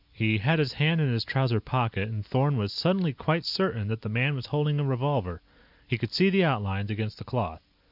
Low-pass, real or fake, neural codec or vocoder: 5.4 kHz; real; none